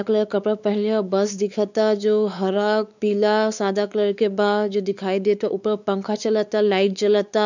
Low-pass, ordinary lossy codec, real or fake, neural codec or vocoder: 7.2 kHz; none; fake; codec, 16 kHz, 4 kbps, X-Codec, WavLM features, trained on Multilingual LibriSpeech